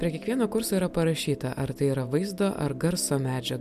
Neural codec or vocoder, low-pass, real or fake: none; 14.4 kHz; real